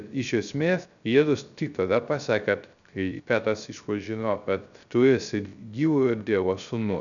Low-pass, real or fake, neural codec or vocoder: 7.2 kHz; fake; codec, 16 kHz, 0.3 kbps, FocalCodec